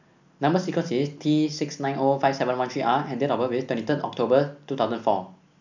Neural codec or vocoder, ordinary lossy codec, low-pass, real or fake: none; none; 7.2 kHz; real